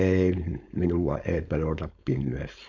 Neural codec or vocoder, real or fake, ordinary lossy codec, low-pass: codec, 16 kHz, 4.8 kbps, FACodec; fake; none; 7.2 kHz